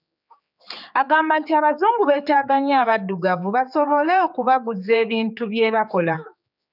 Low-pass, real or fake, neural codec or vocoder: 5.4 kHz; fake; codec, 16 kHz, 4 kbps, X-Codec, HuBERT features, trained on general audio